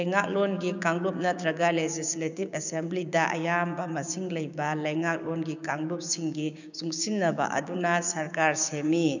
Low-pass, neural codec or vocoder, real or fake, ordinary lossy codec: 7.2 kHz; codec, 16 kHz, 6 kbps, DAC; fake; none